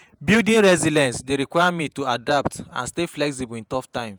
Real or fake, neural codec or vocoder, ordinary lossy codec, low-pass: fake; vocoder, 48 kHz, 128 mel bands, Vocos; none; none